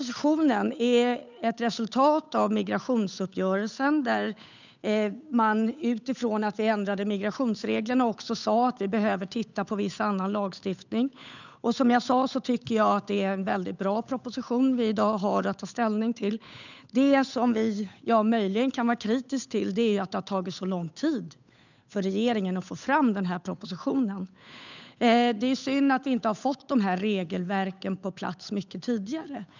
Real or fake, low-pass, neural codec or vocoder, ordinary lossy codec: fake; 7.2 kHz; codec, 16 kHz, 8 kbps, FunCodec, trained on Chinese and English, 25 frames a second; none